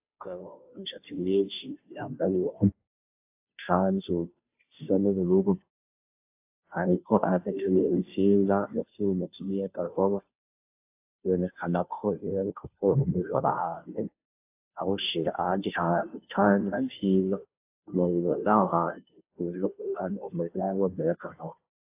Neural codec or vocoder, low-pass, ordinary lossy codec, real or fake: codec, 16 kHz, 0.5 kbps, FunCodec, trained on Chinese and English, 25 frames a second; 3.6 kHz; AAC, 24 kbps; fake